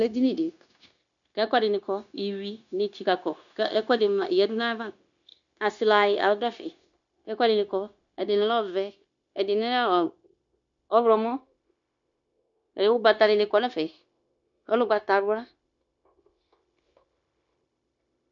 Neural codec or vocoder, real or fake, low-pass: codec, 16 kHz, 0.9 kbps, LongCat-Audio-Codec; fake; 7.2 kHz